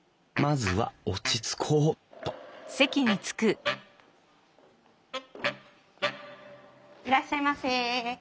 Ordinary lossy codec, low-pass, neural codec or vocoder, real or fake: none; none; none; real